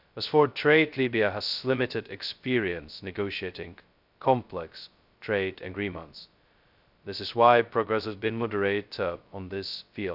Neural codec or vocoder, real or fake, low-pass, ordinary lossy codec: codec, 16 kHz, 0.2 kbps, FocalCodec; fake; 5.4 kHz; none